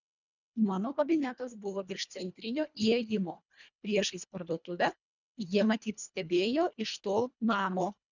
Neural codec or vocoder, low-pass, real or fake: codec, 24 kHz, 1.5 kbps, HILCodec; 7.2 kHz; fake